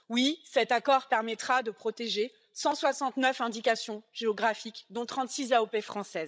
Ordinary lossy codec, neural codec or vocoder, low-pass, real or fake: none; codec, 16 kHz, 16 kbps, FreqCodec, larger model; none; fake